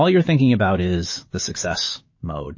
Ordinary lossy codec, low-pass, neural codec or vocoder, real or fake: MP3, 32 kbps; 7.2 kHz; autoencoder, 48 kHz, 128 numbers a frame, DAC-VAE, trained on Japanese speech; fake